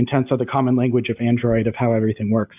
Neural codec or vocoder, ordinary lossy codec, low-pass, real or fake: none; AAC, 32 kbps; 3.6 kHz; real